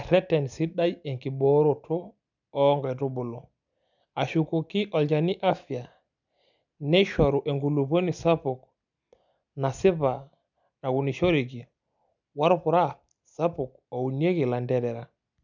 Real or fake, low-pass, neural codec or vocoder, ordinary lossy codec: real; 7.2 kHz; none; none